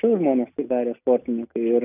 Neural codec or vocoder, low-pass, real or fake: none; 3.6 kHz; real